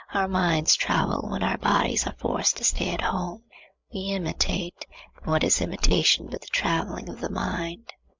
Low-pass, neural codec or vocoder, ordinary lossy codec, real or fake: 7.2 kHz; codec, 16 kHz, 8 kbps, FreqCodec, larger model; MP3, 64 kbps; fake